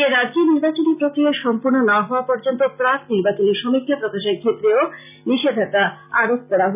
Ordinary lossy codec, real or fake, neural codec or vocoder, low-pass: none; real; none; 3.6 kHz